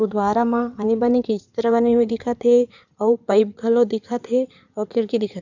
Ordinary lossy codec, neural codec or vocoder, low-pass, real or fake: none; codec, 16 kHz in and 24 kHz out, 2.2 kbps, FireRedTTS-2 codec; 7.2 kHz; fake